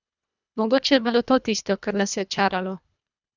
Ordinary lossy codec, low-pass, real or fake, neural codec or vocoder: none; 7.2 kHz; fake; codec, 24 kHz, 1.5 kbps, HILCodec